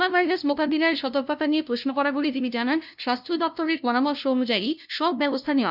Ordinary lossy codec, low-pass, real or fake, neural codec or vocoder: none; 5.4 kHz; fake; codec, 16 kHz, 0.5 kbps, FunCodec, trained on LibriTTS, 25 frames a second